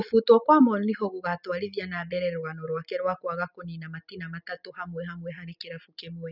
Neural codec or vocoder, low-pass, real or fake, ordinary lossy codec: none; 5.4 kHz; real; none